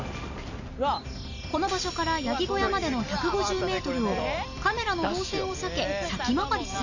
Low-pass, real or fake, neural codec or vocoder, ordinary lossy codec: 7.2 kHz; real; none; none